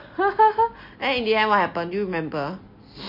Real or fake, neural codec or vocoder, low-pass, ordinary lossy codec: real; none; 5.4 kHz; MP3, 32 kbps